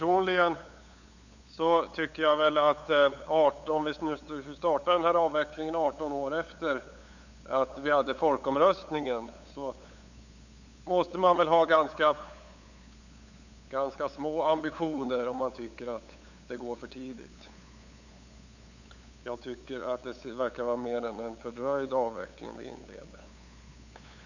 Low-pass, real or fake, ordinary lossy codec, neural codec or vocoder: 7.2 kHz; fake; none; codec, 16 kHz, 8 kbps, FunCodec, trained on LibriTTS, 25 frames a second